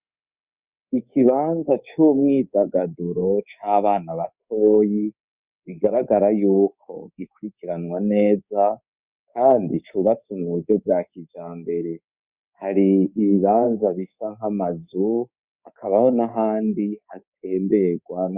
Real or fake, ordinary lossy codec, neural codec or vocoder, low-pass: fake; Opus, 64 kbps; codec, 24 kHz, 3.1 kbps, DualCodec; 3.6 kHz